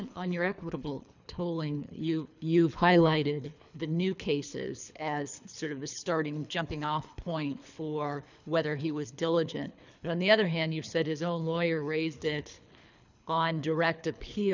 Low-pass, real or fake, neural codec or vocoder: 7.2 kHz; fake; codec, 24 kHz, 3 kbps, HILCodec